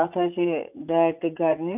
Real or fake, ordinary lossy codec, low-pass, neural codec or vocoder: fake; AAC, 32 kbps; 3.6 kHz; vocoder, 44.1 kHz, 80 mel bands, Vocos